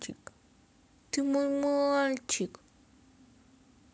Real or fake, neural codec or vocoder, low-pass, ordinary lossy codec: real; none; none; none